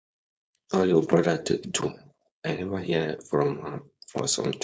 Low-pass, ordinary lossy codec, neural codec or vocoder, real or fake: none; none; codec, 16 kHz, 4.8 kbps, FACodec; fake